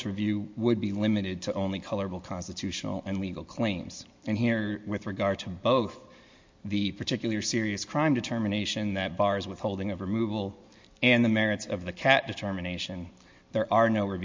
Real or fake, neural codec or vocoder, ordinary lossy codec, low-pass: real; none; MP3, 48 kbps; 7.2 kHz